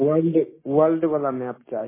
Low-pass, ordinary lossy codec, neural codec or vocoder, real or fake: 3.6 kHz; MP3, 16 kbps; codec, 16 kHz, 6 kbps, DAC; fake